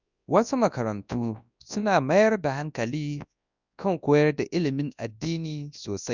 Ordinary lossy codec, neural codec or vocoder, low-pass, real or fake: none; codec, 24 kHz, 0.9 kbps, WavTokenizer, large speech release; 7.2 kHz; fake